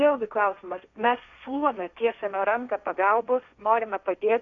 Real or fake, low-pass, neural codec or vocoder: fake; 7.2 kHz; codec, 16 kHz, 1.1 kbps, Voila-Tokenizer